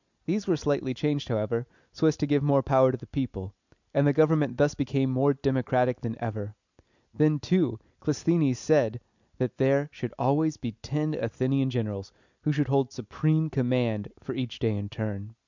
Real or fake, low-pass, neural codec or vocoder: real; 7.2 kHz; none